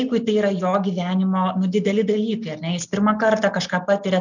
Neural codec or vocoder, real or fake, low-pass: none; real; 7.2 kHz